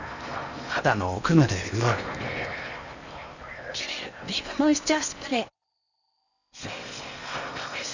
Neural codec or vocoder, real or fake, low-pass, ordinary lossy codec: codec, 16 kHz in and 24 kHz out, 0.8 kbps, FocalCodec, streaming, 65536 codes; fake; 7.2 kHz; none